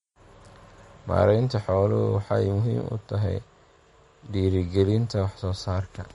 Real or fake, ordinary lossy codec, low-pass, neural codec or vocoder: real; MP3, 48 kbps; 19.8 kHz; none